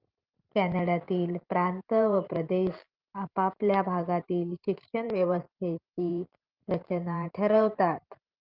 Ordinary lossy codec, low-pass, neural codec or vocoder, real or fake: Opus, 24 kbps; 5.4 kHz; vocoder, 44.1 kHz, 128 mel bands every 512 samples, BigVGAN v2; fake